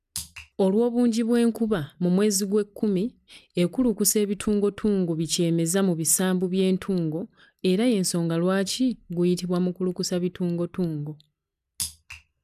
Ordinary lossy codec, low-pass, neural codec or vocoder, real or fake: none; 14.4 kHz; none; real